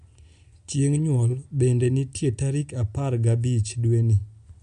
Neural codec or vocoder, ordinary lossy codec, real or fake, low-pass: none; MP3, 64 kbps; real; 10.8 kHz